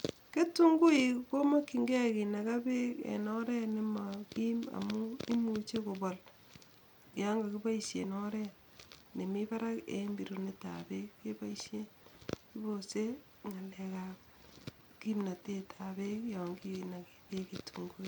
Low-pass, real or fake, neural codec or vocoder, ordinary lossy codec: 19.8 kHz; real; none; MP3, 96 kbps